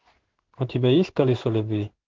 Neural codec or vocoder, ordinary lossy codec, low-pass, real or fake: codec, 16 kHz in and 24 kHz out, 1 kbps, XY-Tokenizer; Opus, 16 kbps; 7.2 kHz; fake